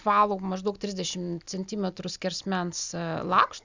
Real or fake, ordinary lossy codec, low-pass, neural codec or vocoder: real; Opus, 64 kbps; 7.2 kHz; none